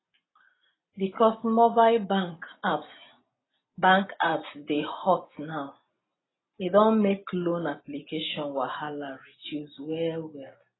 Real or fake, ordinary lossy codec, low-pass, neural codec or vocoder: real; AAC, 16 kbps; 7.2 kHz; none